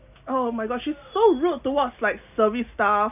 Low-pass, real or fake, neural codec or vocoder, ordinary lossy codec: 3.6 kHz; real; none; none